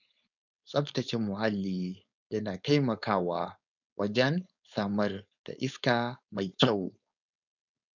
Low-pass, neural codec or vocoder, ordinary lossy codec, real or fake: 7.2 kHz; codec, 16 kHz, 4.8 kbps, FACodec; none; fake